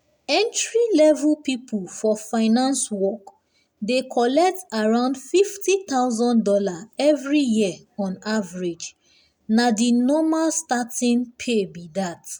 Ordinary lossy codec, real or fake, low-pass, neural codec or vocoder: none; real; 19.8 kHz; none